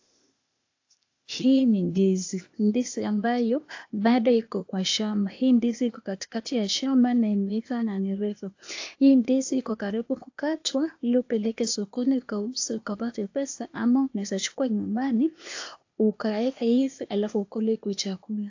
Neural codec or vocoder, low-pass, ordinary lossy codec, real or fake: codec, 16 kHz, 0.8 kbps, ZipCodec; 7.2 kHz; AAC, 48 kbps; fake